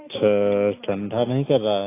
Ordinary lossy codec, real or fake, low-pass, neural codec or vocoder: AAC, 24 kbps; real; 3.6 kHz; none